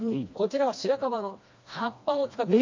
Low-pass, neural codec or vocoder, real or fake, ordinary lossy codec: 7.2 kHz; codec, 16 kHz, 2 kbps, FreqCodec, smaller model; fake; MP3, 48 kbps